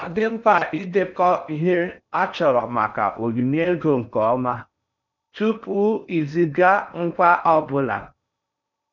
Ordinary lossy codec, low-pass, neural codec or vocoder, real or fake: none; 7.2 kHz; codec, 16 kHz in and 24 kHz out, 0.6 kbps, FocalCodec, streaming, 4096 codes; fake